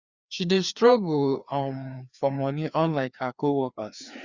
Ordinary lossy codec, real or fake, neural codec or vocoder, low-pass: Opus, 64 kbps; fake; codec, 16 kHz, 2 kbps, FreqCodec, larger model; 7.2 kHz